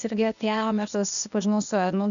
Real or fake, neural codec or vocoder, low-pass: fake; codec, 16 kHz, 0.8 kbps, ZipCodec; 7.2 kHz